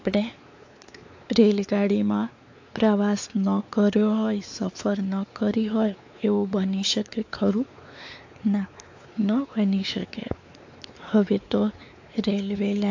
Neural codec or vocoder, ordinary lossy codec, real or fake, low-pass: codec, 16 kHz, 4 kbps, X-Codec, WavLM features, trained on Multilingual LibriSpeech; AAC, 48 kbps; fake; 7.2 kHz